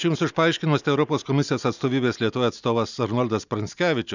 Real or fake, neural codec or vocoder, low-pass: real; none; 7.2 kHz